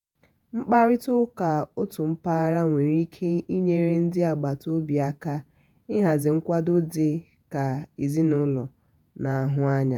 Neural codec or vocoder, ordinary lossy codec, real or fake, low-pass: vocoder, 48 kHz, 128 mel bands, Vocos; none; fake; 19.8 kHz